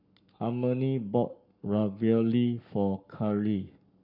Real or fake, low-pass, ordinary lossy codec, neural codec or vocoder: fake; 5.4 kHz; none; codec, 44.1 kHz, 7.8 kbps, Pupu-Codec